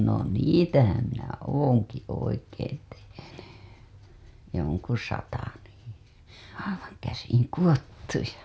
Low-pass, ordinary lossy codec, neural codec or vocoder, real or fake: none; none; none; real